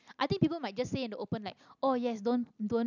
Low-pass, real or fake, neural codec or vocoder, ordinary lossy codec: 7.2 kHz; real; none; none